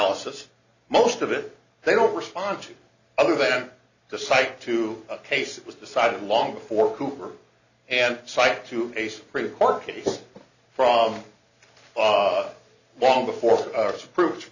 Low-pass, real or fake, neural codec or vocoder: 7.2 kHz; real; none